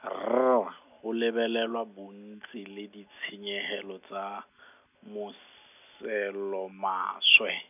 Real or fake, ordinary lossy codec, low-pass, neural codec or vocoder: real; none; 3.6 kHz; none